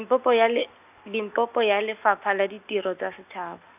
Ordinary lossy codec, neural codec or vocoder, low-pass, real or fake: none; none; 3.6 kHz; real